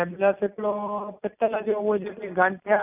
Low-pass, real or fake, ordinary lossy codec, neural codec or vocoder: 3.6 kHz; real; none; none